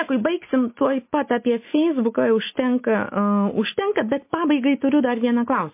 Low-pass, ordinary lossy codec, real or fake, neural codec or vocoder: 3.6 kHz; MP3, 24 kbps; real; none